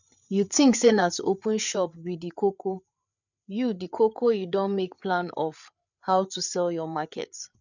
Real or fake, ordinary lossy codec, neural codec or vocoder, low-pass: fake; none; codec, 16 kHz, 8 kbps, FreqCodec, larger model; 7.2 kHz